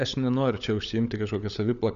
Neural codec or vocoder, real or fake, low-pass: codec, 16 kHz, 16 kbps, FunCodec, trained on LibriTTS, 50 frames a second; fake; 7.2 kHz